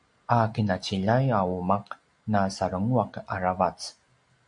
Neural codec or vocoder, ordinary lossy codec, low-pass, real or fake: none; MP3, 64 kbps; 9.9 kHz; real